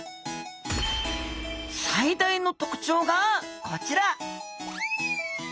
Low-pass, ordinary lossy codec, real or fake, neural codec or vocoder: none; none; real; none